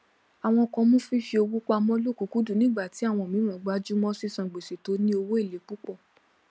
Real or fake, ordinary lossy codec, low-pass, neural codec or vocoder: real; none; none; none